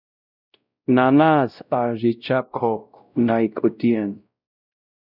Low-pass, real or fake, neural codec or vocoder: 5.4 kHz; fake; codec, 16 kHz, 0.5 kbps, X-Codec, WavLM features, trained on Multilingual LibriSpeech